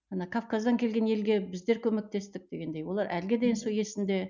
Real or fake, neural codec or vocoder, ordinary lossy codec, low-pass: real; none; none; 7.2 kHz